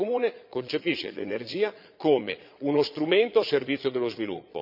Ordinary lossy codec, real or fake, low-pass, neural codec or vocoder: none; fake; 5.4 kHz; vocoder, 22.05 kHz, 80 mel bands, Vocos